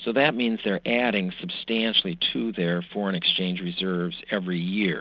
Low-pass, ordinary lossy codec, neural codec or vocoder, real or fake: 7.2 kHz; Opus, 24 kbps; none; real